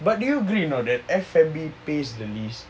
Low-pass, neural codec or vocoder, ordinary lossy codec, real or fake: none; none; none; real